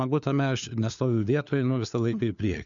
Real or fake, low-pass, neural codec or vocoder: fake; 7.2 kHz; codec, 16 kHz, 4 kbps, FunCodec, trained on LibriTTS, 50 frames a second